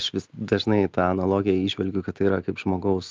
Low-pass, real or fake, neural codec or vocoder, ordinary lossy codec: 7.2 kHz; real; none; Opus, 24 kbps